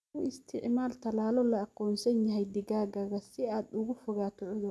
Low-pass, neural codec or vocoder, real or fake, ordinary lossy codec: none; none; real; none